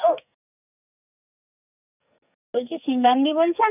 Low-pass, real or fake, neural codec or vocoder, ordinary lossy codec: 3.6 kHz; fake; codec, 44.1 kHz, 2.6 kbps, SNAC; none